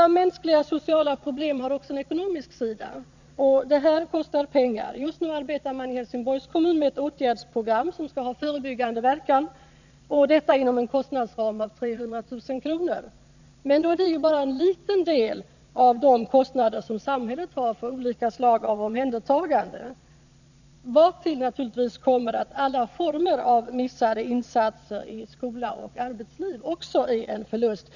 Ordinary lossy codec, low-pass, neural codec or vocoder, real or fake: none; 7.2 kHz; vocoder, 22.05 kHz, 80 mel bands, Vocos; fake